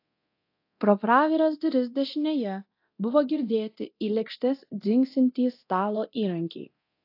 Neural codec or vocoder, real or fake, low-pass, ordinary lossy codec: codec, 24 kHz, 0.9 kbps, DualCodec; fake; 5.4 kHz; AAC, 32 kbps